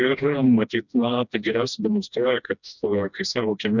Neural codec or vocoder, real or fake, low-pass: codec, 16 kHz, 1 kbps, FreqCodec, smaller model; fake; 7.2 kHz